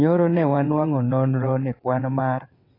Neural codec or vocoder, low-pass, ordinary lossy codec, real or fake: vocoder, 22.05 kHz, 80 mel bands, WaveNeXt; 5.4 kHz; AAC, 24 kbps; fake